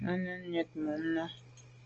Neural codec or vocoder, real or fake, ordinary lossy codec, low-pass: none; real; Opus, 24 kbps; 7.2 kHz